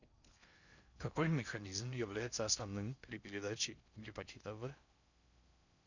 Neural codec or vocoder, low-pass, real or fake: codec, 16 kHz in and 24 kHz out, 0.6 kbps, FocalCodec, streaming, 4096 codes; 7.2 kHz; fake